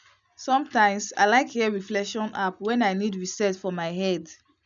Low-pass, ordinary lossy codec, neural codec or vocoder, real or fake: 7.2 kHz; none; none; real